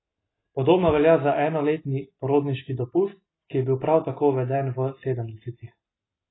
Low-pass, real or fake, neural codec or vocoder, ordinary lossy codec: 7.2 kHz; real; none; AAC, 16 kbps